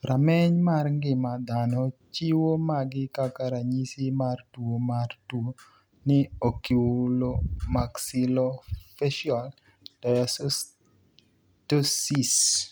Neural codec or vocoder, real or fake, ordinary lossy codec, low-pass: none; real; none; none